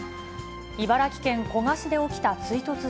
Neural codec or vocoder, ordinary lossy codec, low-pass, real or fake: none; none; none; real